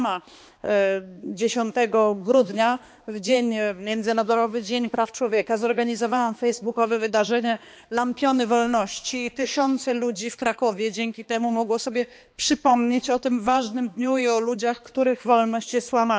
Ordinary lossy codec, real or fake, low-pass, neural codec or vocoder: none; fake; none; codec, 16 kHz, 2 kbps, X-Codec, HuBERT features, trained on balanced general audio